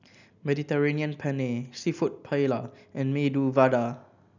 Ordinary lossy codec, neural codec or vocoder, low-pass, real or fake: none; none; 7.2 kHz; real